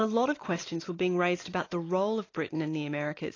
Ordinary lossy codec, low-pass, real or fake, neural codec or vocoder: AAC, 32 kbps; 7.2 kHz; real; none